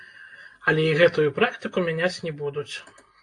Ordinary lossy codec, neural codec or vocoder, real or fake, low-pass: AAC, 48 kbps; none; real; 10.8 kHz